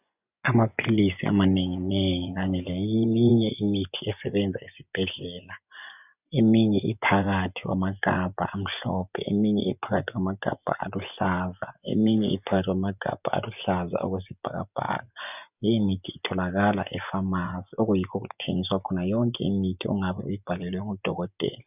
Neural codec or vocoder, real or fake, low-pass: vocoder, 24 kHz, 100 mel bands, Vocos; fake; 3.6 kHz